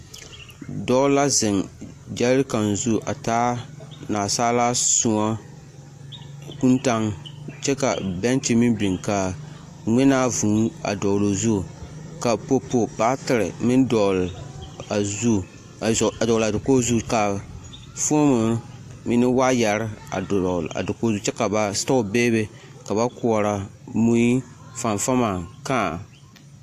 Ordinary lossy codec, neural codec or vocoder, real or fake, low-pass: AAC, 64 kbps; none; real; 14.4 kHz